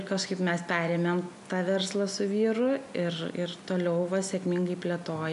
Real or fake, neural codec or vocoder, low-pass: real; none; 10.8 kHz